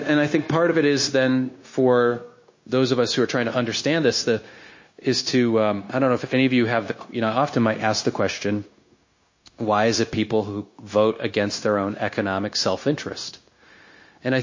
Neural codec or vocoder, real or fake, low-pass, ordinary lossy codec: codec, 16 kHz, 0.9 kbps, LongCat-Audio-Codec; fake; 7.2 kHz; MP3, 32 kbps